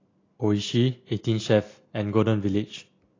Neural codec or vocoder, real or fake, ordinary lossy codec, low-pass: none; real; AAC, 32 kbps; 7.2 kHz